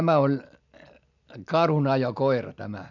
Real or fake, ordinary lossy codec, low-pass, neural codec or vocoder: real; none; 7.2 kHz; none